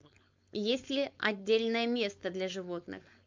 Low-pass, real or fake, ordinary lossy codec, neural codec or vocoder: 7.2 kHz; fake; MP3, 64 kbps; codec, 16 kHz, 4.8 kbps, FACodec